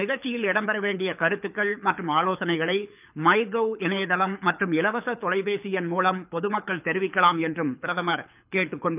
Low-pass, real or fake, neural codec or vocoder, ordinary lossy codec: 3.6 kHz; fake; codec, 24 kHz, 6 kbps, HILCodec; none